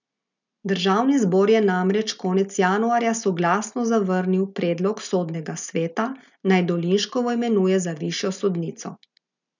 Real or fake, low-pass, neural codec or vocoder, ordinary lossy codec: real; 7.2 kHz; none; none